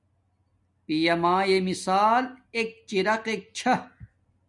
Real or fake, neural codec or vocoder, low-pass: real; none; 10.8 kHz